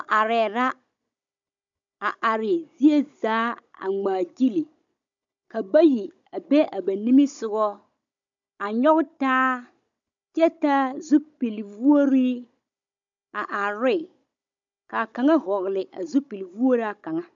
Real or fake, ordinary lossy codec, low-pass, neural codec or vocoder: fake; MP3, 64 kbps; 7.2 kHz; codec, 16 kHz, 16 kbps, FunCodec, trained on Chinese and English, 50 frames a second